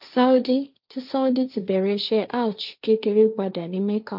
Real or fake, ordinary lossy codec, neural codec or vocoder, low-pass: fake; none; codec, 16 kHz, 1.1 kbps, Voila-Tokenizer; 5.4 kHz